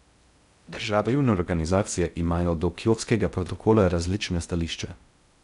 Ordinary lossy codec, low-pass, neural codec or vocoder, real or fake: none; 10.8 kHz; codec, 16 kHz in and 24 kHz out, 0.6 kbps, FocalCodec, streaming, 2048 codes; fake